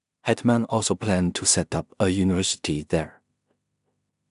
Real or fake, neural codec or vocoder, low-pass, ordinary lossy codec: fake; codec, 16 kHz in and 24 kHz out, 0.4 kbps, LongCat-Audio-Codec, two codebook decoder; 10.8 kHz; MP3, 96 kbps